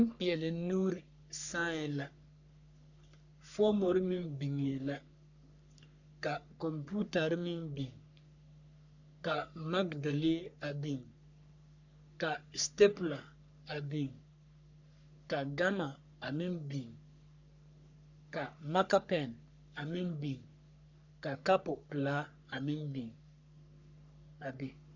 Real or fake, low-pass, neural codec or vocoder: fake; 7.2 kHz; codec, 44.1 kHz, 3.4 kbps, Pupu-Codec